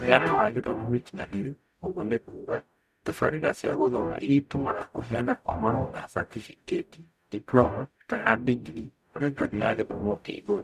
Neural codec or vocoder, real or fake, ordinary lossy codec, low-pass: codec, 44.1 kHz, 0.9 kbps, DAC; fake; none; 14.4 kHz